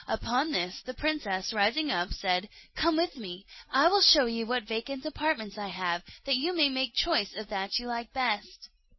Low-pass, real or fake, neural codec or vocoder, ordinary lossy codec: 7.2 kHz; real; none; MP3, 24 kbps